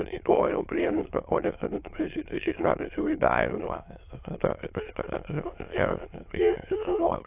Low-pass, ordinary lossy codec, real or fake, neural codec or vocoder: 3.6 kHz; AAC, 32 kbps; fake; autoencoder, 22.05 kHz, a latent of 192 numbers a frame, VITS, trained on many speakers